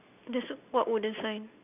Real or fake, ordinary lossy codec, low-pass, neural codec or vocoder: real; none; 3.6 kHz; none